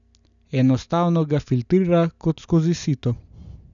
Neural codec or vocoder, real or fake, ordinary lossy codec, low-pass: none; real; none; 7.2 kHz